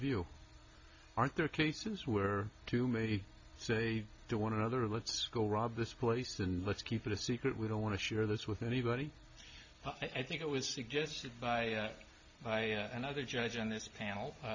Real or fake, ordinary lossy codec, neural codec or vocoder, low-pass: real; MP3, 48 kbps; none; 7.2 kHz